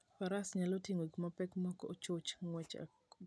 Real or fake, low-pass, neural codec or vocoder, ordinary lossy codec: real; none; none; none